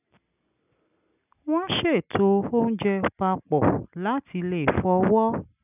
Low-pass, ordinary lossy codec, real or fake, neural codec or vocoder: 3.6 kHz; none; real; none